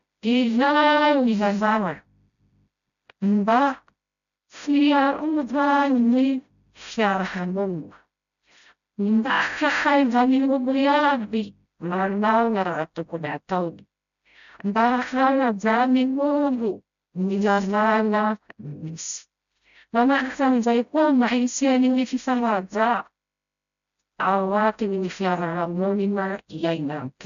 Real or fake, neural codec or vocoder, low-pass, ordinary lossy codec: fake; codec, 16 kHz, 0.5 kbps, FreqCodec, smaller model; 7.2 kHz; none